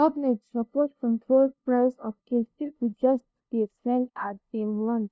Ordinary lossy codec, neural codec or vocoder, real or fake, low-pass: none; codec, 16 kHz, 0.5 kbps, FunCodec, trained on LibriTTS, 25 frames a second; fake; none